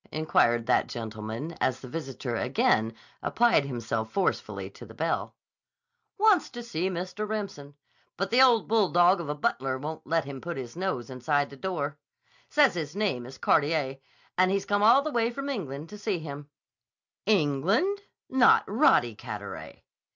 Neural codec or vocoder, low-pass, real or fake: none; 7.2 kHz; real